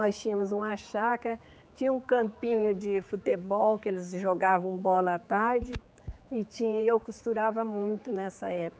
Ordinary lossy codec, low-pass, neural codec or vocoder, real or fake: none; none; codec, 16 kHz, 4 kbps, X-Codec, HuBERT features, trained on general audio; fake